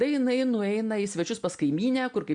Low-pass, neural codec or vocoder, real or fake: 9.9 kHz; none; real